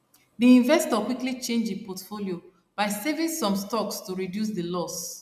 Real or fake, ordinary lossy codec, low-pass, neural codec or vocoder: real; none; 14.4 kHz; none